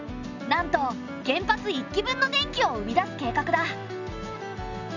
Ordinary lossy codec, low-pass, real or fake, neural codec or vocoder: none; 7.2 kHz; real; none